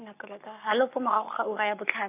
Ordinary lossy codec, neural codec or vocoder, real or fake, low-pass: none; codec, 44.1 kHz, 7.8 kbps, Pupu-Codec; fake; 3.6 kHz